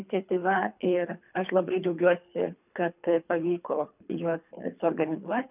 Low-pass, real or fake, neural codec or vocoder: 3.6 kHz; fake; codec, 24 kHz, 3 kbps, HILCodec